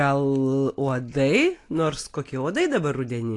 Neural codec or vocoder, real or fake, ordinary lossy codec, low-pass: none; real; AAC, 48 kbps; 10.8 kHz